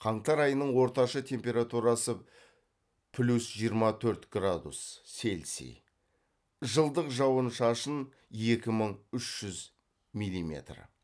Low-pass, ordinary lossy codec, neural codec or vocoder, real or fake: none; none; none; real